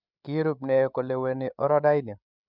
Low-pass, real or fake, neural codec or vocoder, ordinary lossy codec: 5.4 kHz; fake; codec, 16 kHz, 16 kbps, FreqCodec, larger model; none